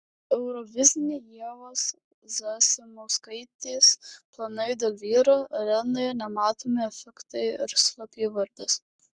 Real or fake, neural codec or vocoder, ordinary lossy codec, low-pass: fake; codec, 44.1 kHz, 7.8 kbps, Pupu-Codec; Opus, 64 kbps; 9.9 kHz